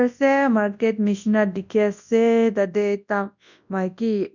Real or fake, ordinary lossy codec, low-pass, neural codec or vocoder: fake; none; 7.2 kHz; codec, 24 kHz, 0.9 kbps, WavTokenizer, large speech release